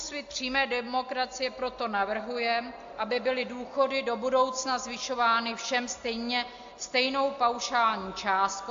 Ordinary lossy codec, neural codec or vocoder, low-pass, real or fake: AAC, 48 kbps; none; 7.2 kHz; real